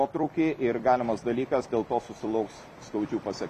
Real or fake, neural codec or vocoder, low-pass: fake; vocoder, 44.1 kHz, 128 mel bands every 256 samples, BigVGAN v2; 14.4 kHz